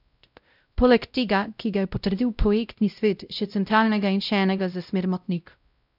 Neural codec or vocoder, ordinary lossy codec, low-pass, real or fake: codec, 16 kHz, 0.5 kbps, X-Codec, WavLM features, trained on Multilingual LibriSpeech; none; 5.4 kHz; fake